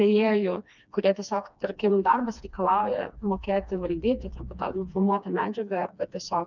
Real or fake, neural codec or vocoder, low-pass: fake; codec, 16 kHz, 2 kbps, FreqCodec, smaller model; 7.2 kHz